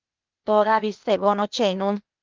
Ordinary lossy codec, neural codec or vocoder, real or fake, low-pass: Opus, 24 kbps; codec, 16 kHz, 0.8 kbps, ZipCodec; fake; 7.2 kHz